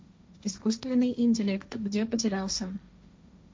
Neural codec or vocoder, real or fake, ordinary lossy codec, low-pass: codec, 16 kHz, 1.1 kbps, Voila-Tokenizer; fake; none; none